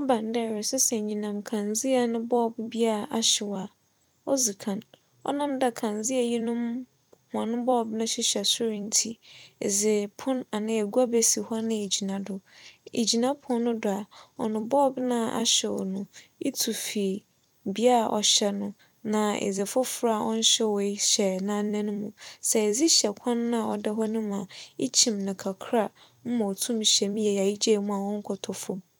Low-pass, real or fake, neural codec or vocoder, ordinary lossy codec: 19.8 kHz; fake; vocoder, 44.1 kHz, 128 mel bands every 256 samples, BigVGAN v2; none